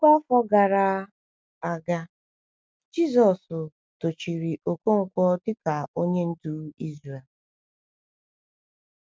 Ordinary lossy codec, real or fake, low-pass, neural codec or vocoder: none; real; none; none